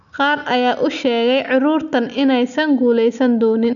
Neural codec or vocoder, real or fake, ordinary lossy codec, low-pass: none; real; none; 7.2 kHz